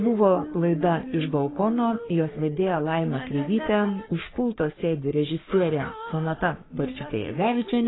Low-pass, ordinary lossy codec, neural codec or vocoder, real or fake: 7.2 kHz; AAC, 16 kbps; codec, 44.1 kHz, 3.4 kbps, Pupu-Codec; fake